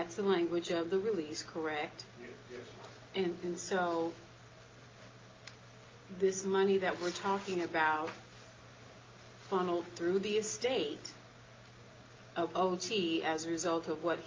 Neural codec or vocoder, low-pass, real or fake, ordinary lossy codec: none; 7.2 kHz; real; Opus, 24 kbps